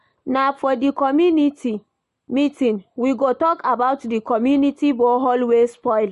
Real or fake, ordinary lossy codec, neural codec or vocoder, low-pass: real; MP3, 48 kbps; none; 14.4 kHz